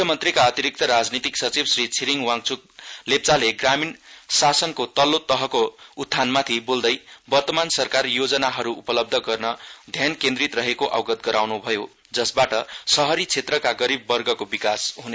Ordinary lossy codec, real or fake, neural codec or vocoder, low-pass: none; real; none; none